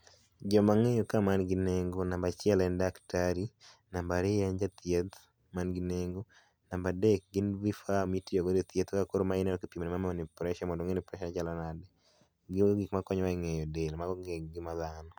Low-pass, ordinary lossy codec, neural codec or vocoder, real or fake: none; none; none; real